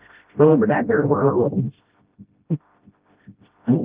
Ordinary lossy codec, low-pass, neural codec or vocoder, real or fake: Opus, 32 kbps; 3.6 kHz; codec, 16 kHz, 0.5 kbps, FreqCodec, smaller model; fake